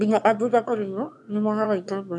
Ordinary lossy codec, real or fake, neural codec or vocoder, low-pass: none; fake; autoencoder, 22.05 kHz, a latent of 192 numbers a frame, VITS, trained on one speaker; none